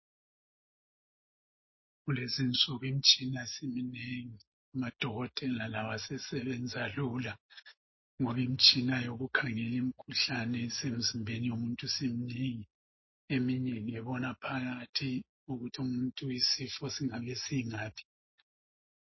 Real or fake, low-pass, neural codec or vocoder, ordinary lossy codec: real; 7.2 kHz; none; MP3, 24 kbps